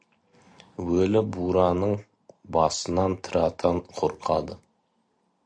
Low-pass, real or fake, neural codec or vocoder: 9.9 kHz; real; none